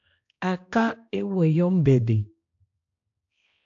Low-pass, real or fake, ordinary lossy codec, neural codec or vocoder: 7.2 kHz; fake; AAC, 48 kbps; codec, 16 kHz, 1 kbps, X-Codec, HuBERT features, trained on balanced general audio